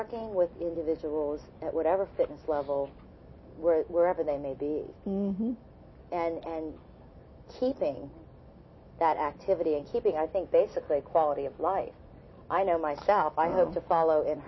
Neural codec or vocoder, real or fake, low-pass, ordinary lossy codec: none; real; 7.2 kHz; MP3, 24 kbps